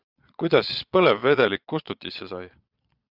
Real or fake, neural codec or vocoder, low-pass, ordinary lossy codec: fake; vocoder, 22.05 kHz, 80 mel bands, Vocos; 5.4 kHz; Opus, 64 kbps